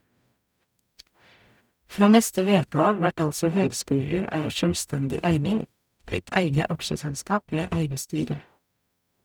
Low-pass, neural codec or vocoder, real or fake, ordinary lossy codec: none; codec, 44.1 kHz, 0.9 kbps, DAC; fake; none